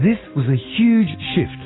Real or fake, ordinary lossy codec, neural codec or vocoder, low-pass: real; AAC, 16 kbps; none; 7.2 kHz